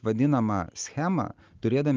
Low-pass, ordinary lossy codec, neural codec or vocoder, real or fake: 7.2 kHz; Opus, 24 kbps; none; real